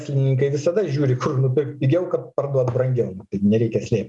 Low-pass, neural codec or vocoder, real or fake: 10.8 kHz; none; real